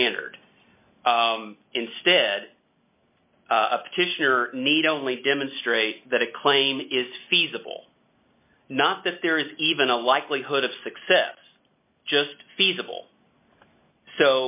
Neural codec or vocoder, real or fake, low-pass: none; real; 3.6 kHz